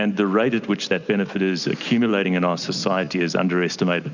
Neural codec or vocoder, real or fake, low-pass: none; real; 7.2 kHz